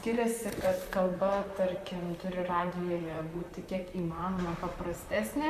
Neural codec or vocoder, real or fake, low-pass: vocoder, 44.1 kHz, 128 mel bands, Pupu-Vocoder; fake; 14.4 kHz